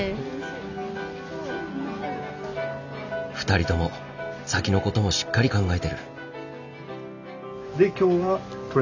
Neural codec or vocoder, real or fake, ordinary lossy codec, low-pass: none; real; none; 7.2 kHz